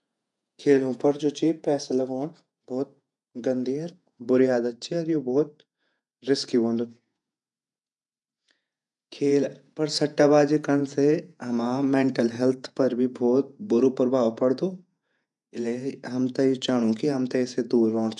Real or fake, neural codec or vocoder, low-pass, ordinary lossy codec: fake; vocoder, 48 kHz, 128 mel bands, Vocos; 10.8 kHz; none